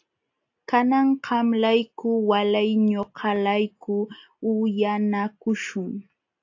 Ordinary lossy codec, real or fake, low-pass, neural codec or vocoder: AAC, 48 kbps; real; 7.2 kHz; none